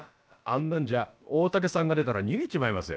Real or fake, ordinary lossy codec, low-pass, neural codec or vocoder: fake; none; none; codec, 16 kHz, about 1 kbps, DyCAST, with the encoder's durations